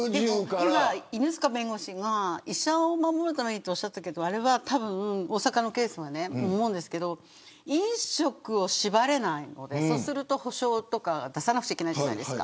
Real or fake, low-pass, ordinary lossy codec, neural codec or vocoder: real; none; none; none